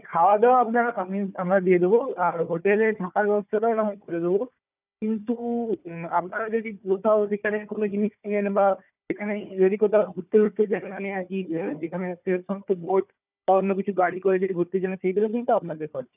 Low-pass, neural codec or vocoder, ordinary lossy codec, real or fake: 3.6 kHz; codec, 16 kHz, 4 kbps, FunCodec, trained on Chinese and English, 50 frames a second; none; fake